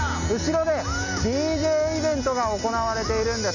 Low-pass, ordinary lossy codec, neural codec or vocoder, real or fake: 7.2 kHz; none; none; real